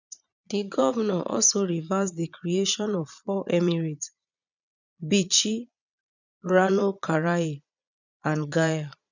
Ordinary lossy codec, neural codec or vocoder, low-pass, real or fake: none; vocoder, 22.05 kHz, 80 mel bands, Vocos; 7.2 kHz; fake